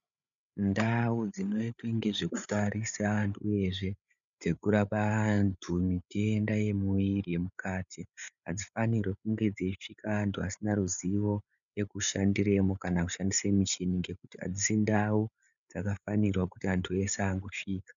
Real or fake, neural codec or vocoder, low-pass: fake; codec, 16 kHz, 8 kbps, FreqCodec, larger model; 7.2 kHz